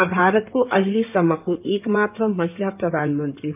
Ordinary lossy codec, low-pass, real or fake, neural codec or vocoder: none; 3.6 kHz; fake; codec, 16 kHz in and 24 kHz out, 2.2 kbps, FireRedTTS-2 codec